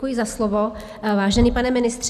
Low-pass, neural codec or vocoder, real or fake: 14.4 kHz; none; real